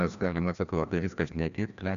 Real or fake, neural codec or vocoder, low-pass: fake; codec, 16 kHz, 1 kbps, FreqCodec, larger model; 7.2 kHz